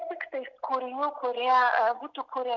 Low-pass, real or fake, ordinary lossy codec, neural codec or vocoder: 7.2 kHz; real; MP3, 64 kbps; none